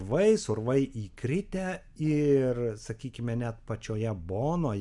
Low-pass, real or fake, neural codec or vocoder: 10.8 kHz; real; none